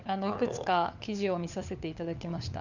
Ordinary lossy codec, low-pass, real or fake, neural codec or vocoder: none; 7.2 kHz; fake; codec, 16 kHz, 4 kbps, FunCodec, trained on LibriTTS, 50 frames a second